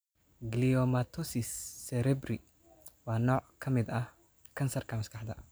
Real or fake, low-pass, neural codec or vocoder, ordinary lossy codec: real; none; none; none